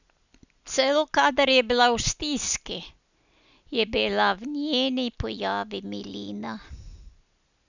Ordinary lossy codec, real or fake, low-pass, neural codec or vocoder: none; real; 7.2 kHz; none